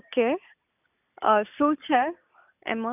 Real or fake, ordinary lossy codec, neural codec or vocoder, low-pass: real; none; none; 3.6 kHz